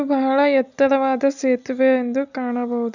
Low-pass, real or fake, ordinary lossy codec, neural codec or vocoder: 7.2 kHz; real; none; none